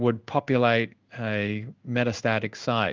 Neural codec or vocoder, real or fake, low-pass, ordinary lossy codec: codec, 16 kHz in and 24 kHz out, 1 kbps, XY-Tokenizer; fake; 7.2 kHz; Opus, 24 kbps